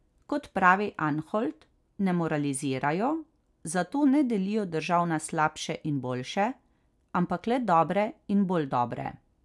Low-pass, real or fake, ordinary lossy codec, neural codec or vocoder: none; real; none; none